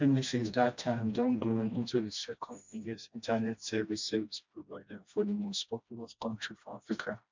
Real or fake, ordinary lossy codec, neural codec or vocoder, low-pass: fake; MP3, 64 kbps; codec, 16 kHz, 1 kbps, FreqCodec, smaller model; 7.2 kHz